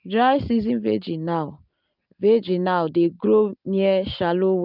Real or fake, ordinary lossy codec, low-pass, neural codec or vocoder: real; none; 5.4 kHz; none